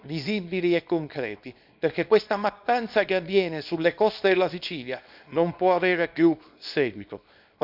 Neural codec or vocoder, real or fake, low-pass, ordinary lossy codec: codec, 24 kHz, 0.9 kbps, WavTokenizer, small release; fake; 5.4 kHz; none